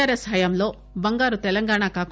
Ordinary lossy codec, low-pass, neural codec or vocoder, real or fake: none; none; none; real